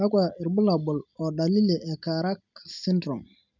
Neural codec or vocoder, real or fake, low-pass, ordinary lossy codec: none; real; 7.2 kHz; none